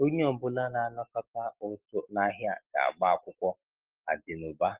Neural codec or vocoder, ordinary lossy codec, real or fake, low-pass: none; Opus, 16 kbps; real; 3.6 kHz